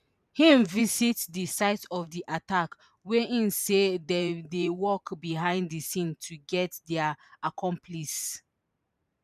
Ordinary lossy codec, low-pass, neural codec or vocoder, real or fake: none; 14.4 kHz; vocoder, 44.1 kHz, 128 mel bands every 512 samples, BigVGAN v2; fake